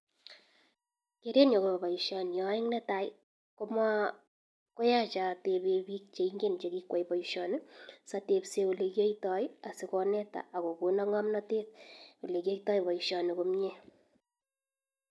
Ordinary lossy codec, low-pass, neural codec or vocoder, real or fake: none; none; none; real